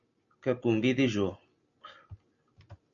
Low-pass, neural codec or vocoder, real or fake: 7.2 kHz; none; real